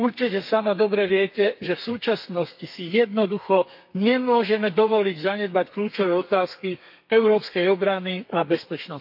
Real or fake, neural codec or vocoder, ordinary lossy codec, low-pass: fake; codec, 32 kHz, 1.9 kbps, SNAC; MP3, 32 kbps; 5.4 kHz